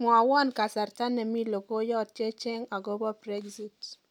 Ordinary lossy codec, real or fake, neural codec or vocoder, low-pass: none; real; none; 19.8 kHz